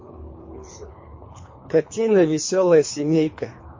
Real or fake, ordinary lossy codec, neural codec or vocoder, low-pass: fake; MP3, 32 kbps; codec, 24 kHz, 3 kbps, HILCodec; 7.2 kHz